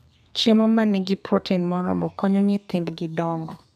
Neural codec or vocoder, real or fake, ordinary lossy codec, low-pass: codec, 32 kHz, 1.9 kbps, SNAC; fake; none; 14.4 kHz